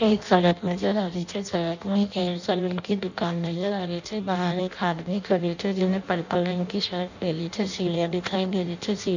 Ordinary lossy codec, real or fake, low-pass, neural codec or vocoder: none; fake; 7.2 kHz; codec, 16 kHz in and 24 kHz out, 0.6 kbps, FireRedTTS-2 codec